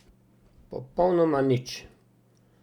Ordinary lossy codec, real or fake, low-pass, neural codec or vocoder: none; real; 19.8 kHz; none